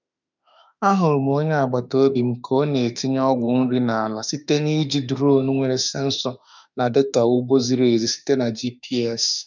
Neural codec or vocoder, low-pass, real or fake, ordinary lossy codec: autoencoder, 48 kHz, 32 numbers a frame, DAC-VAE, trained on Japanese speech; 7.2 kHz; fake; none